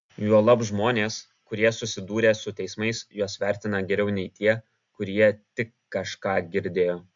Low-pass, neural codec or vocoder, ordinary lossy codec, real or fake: 7.2 kHz; none; AAC, 64 kbps; real